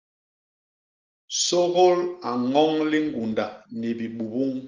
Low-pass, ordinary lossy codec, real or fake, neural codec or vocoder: 7.2 kHz; Opus, 32 kbps; real; none